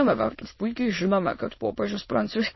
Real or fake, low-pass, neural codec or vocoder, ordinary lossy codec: fake; 7.2 kHz; autoencoder, 22.05 kHz, a latent of 192 numbers a frame, VITS, trained on many speakers; MP3, 24 kbps